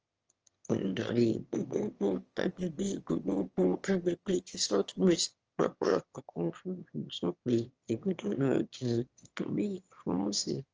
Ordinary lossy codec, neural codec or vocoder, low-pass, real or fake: Opus, 24 kbps; autoencoder, 22.05 kHz, a latent of 192 numbers a frame, VITS, trained on one speaker; 7.2 kHz; fake